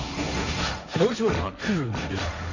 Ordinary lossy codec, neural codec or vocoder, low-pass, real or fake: none; codec, 16 kHz, 1.1 kbps, Voila-Tokenizer; 7.2 kHz; fake